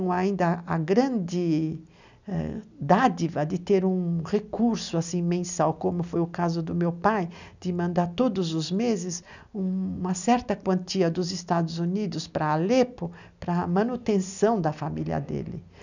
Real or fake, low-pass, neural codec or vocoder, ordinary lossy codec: real; 7.2 kHz; none; none